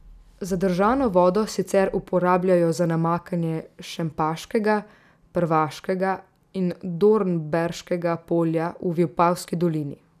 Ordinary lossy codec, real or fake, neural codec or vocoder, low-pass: none; real; none; 14.4 kHz